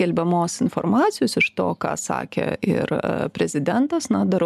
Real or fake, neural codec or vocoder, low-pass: real; none; 14.4 kHz